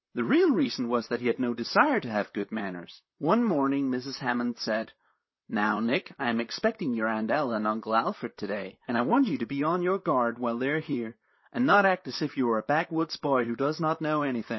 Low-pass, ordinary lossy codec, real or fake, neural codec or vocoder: 7.2 kHz; MP3, 24 kbps; fake; vocoder, 44.1 kHz, 128 mel bands, Pupu-Vocoder